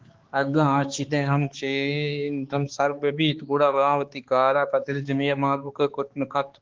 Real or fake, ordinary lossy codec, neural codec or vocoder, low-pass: fake; Opus, 16 kbps; codec, 16 kHz, 2 kbps, X-Codec, HuBERT features, trained on balanced general audio; 7.2 kHz